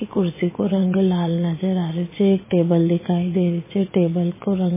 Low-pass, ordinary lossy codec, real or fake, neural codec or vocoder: 3.6 kHz; MP3, 16 kbps; fake; vocoder, 22.05 kHz, 80 mel bands, Vocos